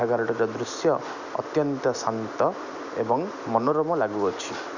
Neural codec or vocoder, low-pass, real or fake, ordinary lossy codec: none; 7.2 kHz; real; none